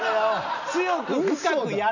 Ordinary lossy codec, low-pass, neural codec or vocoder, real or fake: none; 7.2 kHz; none; real